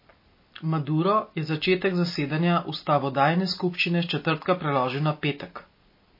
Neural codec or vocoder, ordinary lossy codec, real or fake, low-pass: none; MP3, 24 kbps; real; 5.4 kHz